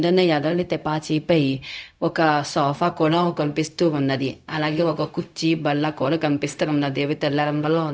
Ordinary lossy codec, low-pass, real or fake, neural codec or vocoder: none; none; fake; codec, 16 kHz, 0.4 kbps, LongCat-Audio-Codec